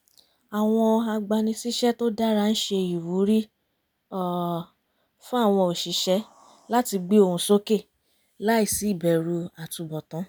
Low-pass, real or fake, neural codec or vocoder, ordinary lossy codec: none; real; none; none